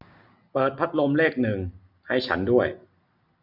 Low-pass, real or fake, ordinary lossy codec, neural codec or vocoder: 5.4 kHz; real; none; none